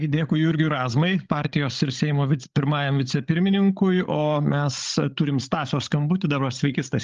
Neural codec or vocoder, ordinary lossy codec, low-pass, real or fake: codec, 16 kHz, 16 kbps, FreqCodec, larger model; Opus, 32 kbps; 7.2 kHz; fake